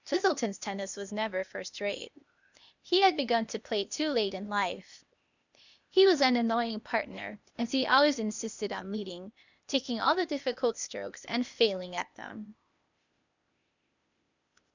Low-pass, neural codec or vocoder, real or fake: 7.2 kHz; codec, 16 kHz, 0.8 kbps, ZipCodec; fake